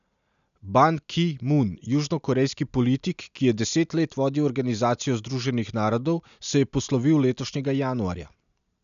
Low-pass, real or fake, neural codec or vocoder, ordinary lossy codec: 7.2 kHz; real; none; none